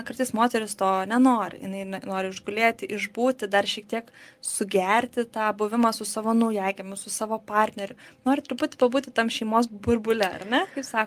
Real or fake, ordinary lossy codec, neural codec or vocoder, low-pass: real; Opus, 32 kbps; none; 14.4 kHz